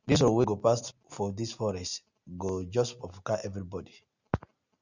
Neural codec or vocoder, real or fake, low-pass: none; real; 7.2 kHz